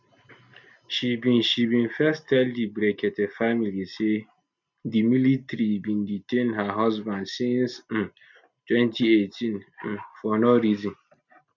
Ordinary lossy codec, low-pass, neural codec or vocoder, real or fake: none; 7.2 kHz; none; real